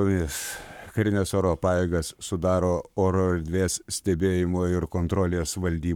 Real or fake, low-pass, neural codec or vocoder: fake; 19.8 kHz; codec, 44.1 kHz, 7.8 kbps, Pupu-Codec